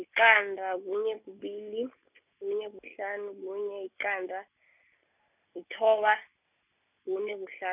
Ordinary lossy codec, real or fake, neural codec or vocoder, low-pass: none; real; none; 3.6 kHz